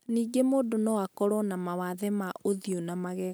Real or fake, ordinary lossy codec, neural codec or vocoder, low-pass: real; none; none; none